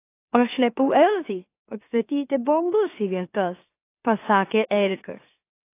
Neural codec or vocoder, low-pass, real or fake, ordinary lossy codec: autoencoder, 44.1 kHz, a latent of 192 numbers a frame, MeloTTS; 3.6 kHz; fake; AAC, 24 kbps